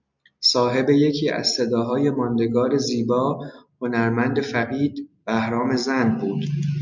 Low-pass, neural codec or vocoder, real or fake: 7.2 kHz; none; real